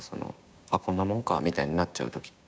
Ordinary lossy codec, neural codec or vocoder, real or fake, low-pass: none; codec, 16 kHz, 6 kbps, DAC; fake; none